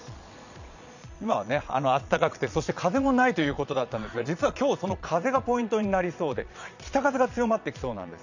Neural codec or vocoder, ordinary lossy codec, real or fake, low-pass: vocoder, 22.05 kHz, 80 mel bands, Vocos; none; fake; 7.2 kHz